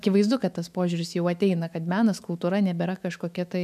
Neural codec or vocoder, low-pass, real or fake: autoencoder, 48 kHz, 128 numbers a frame, DAC-VAE, trained on Japanese speech; 14.4 kHz; fake